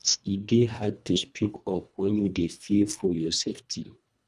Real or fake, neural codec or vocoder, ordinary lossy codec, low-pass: fake; codec, 24 kHz, 1.5 kbps, HILCodec; none; none